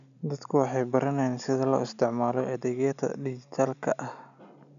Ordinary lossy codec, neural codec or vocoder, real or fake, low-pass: none; none; real; 7.2 kHz